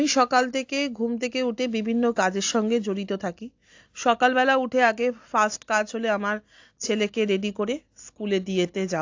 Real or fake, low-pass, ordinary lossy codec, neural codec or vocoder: real; 7.2 kHz; AAC, 48 kbps; none